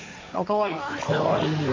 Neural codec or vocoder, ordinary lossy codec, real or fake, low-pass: codec, 16 kHz, 1.1 kbps, Voila-Tokenizer; MP3, 48 kbps; fake; 7.2 kHz